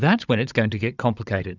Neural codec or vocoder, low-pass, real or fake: codec, 16 kHz, 16 kbps, FunCodec, trained on Chinese and English, 50 frames a second; 7.2 kHz; fake